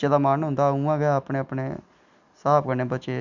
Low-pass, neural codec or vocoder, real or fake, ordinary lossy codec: 7.2 kHz; none; real; none